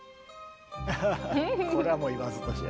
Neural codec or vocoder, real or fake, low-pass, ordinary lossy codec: none; real; none; none